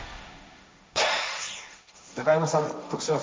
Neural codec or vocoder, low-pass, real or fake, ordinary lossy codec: codec, 16 kHz, 1.1 kbps, Voila-Tokenizer; none; fake; none